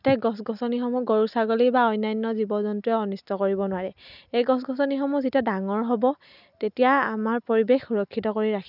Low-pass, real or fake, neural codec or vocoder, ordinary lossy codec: 5.4 kHz; real; none; none